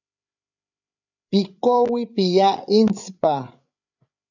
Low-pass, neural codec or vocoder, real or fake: 7.2 kHz; codec, 16 kHz, 8 kbps, FreqCodec, larger model; fake